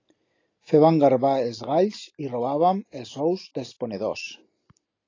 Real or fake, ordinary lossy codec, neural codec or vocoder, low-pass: real; AAC, 32 kbps; none; 7.2 kHz